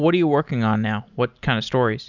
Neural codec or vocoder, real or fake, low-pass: none; real; 7.2 kHz